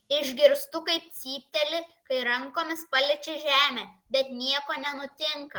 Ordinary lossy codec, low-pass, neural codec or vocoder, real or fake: Opus, 16 kbps; 19.8 kHz; none; real